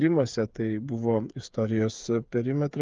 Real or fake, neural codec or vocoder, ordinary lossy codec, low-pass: fake; codec, 16 kHz, 8 kbps, FreqCodec, smaller model; Opus, 24 kbps; 7.2 kHz